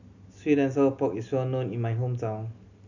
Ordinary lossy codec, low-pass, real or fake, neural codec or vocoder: none; 7.2 kHz; real; none